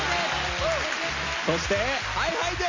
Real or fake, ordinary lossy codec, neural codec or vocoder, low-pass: real; MP3, 64 kbps; none; 7.2 kHz